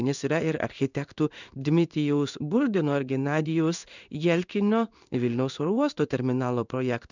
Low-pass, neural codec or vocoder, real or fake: 7.2 kHz; codec, 16 kHz in and 24 kHz out, 1 kbps, XY-Tokenizer; fake